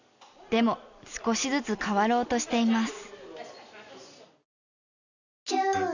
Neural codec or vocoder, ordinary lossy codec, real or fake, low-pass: none; none; real; 7.2 kHz